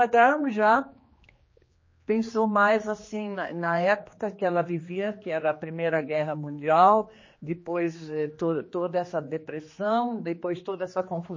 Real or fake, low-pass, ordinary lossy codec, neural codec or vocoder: fake; 7.2 kHz; MP3, 32 kbps; codec, 16 kHz, 2 kbps, X-Codec, HuBERT features, trained on general audio